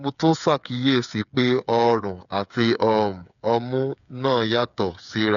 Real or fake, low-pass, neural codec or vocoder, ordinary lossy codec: fake; 7.2 kHz; codec, 16 kHz, 8 kbps, FreqCodec, smaller model; MP3, 96 kbps